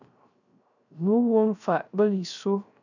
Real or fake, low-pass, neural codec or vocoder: fake; 7.2 kHz; codec, 16 kHz, 0.3 kbps, FocalCodec